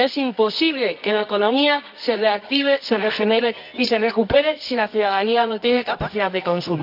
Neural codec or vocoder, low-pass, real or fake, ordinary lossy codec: codec, 24 kHz, 0.9 kbps, WavTokenizer, medium music audio release; 5.4 kHz; fake; AAC, 48 kbps